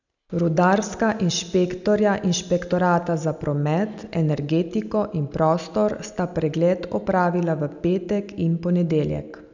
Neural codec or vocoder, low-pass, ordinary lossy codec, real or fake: none; 7.2 kHz; none; real